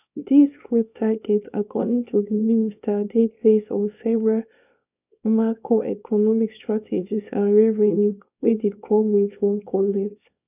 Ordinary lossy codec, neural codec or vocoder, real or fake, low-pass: none; codec, 24 kHz, 0.9 kbps, WavTokenizer, small release; fake; 3.6 kHz